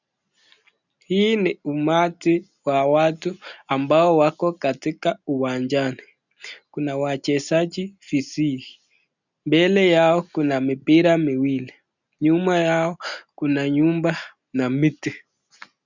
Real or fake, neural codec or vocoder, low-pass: real; none; 7.2 kHz